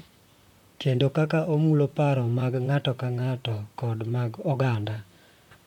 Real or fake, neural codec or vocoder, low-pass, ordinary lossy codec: fake; vocoder, 44.1 kHz, 128 mel bands, Pupu-Vocoder; 19.8 kHz; MP3, 96 kbps